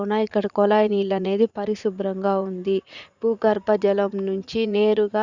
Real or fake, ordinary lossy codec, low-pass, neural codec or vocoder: fake; Opus, 64 kbps; 7.2 kHz; vocoder, 44.1 kHz, 80 mel bands, Vocos